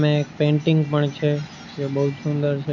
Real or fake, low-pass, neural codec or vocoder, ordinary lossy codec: real; 7.2 kHz; none; MP3, 48 kbps